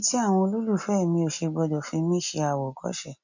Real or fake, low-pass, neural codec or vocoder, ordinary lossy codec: real; 7.2 kHz; none; none